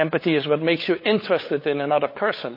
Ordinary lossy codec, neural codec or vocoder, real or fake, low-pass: MP3, 24 kbps; codec, 16 kHz, 8 kbps, FunCodec, trained on LibriTTS, 25 frames a second; fake; 5.4 kHz